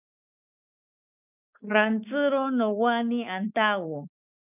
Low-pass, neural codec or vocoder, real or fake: 3.6 kHz; codec, 16 kHz, 6 kbps, DAC; fake